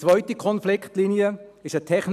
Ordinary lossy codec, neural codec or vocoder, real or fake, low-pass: none; none; real; 14.4 kHz